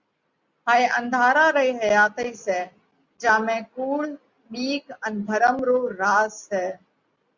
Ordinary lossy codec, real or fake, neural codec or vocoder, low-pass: Opus, 64 kbps; real; none; 7.2 kHz